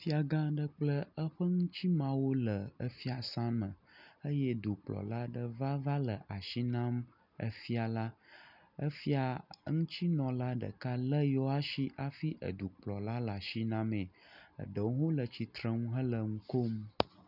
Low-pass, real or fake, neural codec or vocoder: 5.4 kHz; real; none